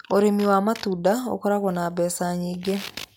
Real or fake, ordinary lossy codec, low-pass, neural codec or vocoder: real; MP3, 96 kbps; 19.8 kHz; none